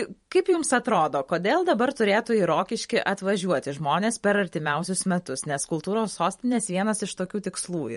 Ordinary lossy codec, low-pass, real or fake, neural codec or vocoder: MP3, 48 kbps; 19.8 kHz; fake; vocoder, 44.1 kHz, 128 mel bands every 512 samples, BigVGAN v2